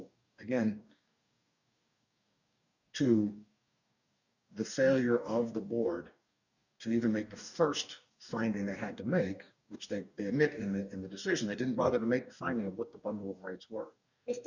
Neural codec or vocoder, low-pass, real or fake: codec, 44.1 kHz, 2.6 kbps, DAC; 7.2 kHz; fake